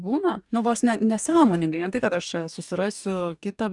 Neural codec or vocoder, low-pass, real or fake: codec, 44.1 kHz, 2.6 kbps, DAC; 10.8 kHz; fake